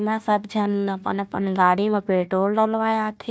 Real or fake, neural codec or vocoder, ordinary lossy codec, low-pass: fake; codec, 16 kHz, 1 kbps, FunCodec, trained on Chinese and English, 50 frames a second; none; none